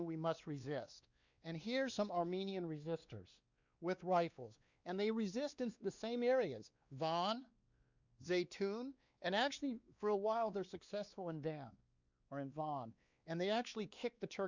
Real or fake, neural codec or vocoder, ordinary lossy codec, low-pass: fake; codec, 16 kHz, 2 kbps, X-Codec, WavLM features, trained on Multilingual LibriSpeech; Opus, 64 kbps; 7.2 kHz